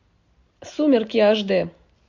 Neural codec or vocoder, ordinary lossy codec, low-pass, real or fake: none; MP3, 48 kbps; 7.2 kHz; real